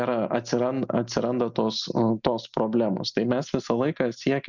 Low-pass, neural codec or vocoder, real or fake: 7.2 kHz; none; real